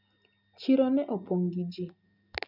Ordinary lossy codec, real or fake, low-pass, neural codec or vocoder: none; real; 5.4 kHz; none